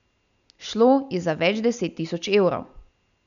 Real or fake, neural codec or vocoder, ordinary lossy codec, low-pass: real; none; none; 7.2 kHz